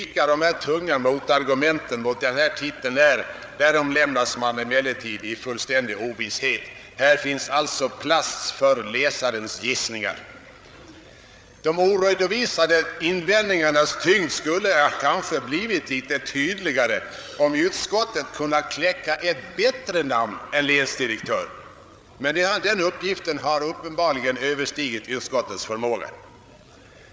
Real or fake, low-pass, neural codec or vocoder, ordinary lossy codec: fake; none; codec, 16 kHz, 8 kbps, FreqCodec, larger model; none